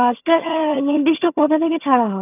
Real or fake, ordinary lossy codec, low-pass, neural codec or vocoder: fake; none; 3.6 kHz; vocoder, 22.05 kHz, 80 mel bands, HiFi-GAN